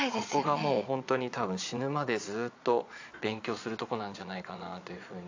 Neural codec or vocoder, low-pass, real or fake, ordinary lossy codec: vocoder, 22.05 kHz, 80 mel bands, Vocos; 7.2 kHz; fake; AAC, 48 kbps